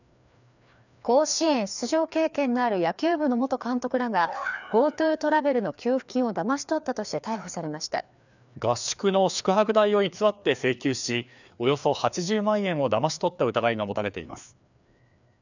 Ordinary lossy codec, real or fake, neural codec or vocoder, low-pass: none; fake; codec, 16 kHz, 2 kbps, FreqCodec, larger model; 7.2 kHz